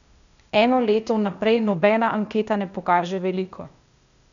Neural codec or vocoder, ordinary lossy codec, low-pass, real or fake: codec, 16 kHz, 0.8 kbps, ZipCodec; none; 7.2 kHz; fake